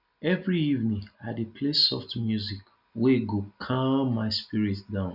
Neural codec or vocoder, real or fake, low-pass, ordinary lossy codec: none; real; 5.4 kHz; none